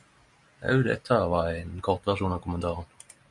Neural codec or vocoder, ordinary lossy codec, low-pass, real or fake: none; MP3, 64 kbps; 10.8 kHz; real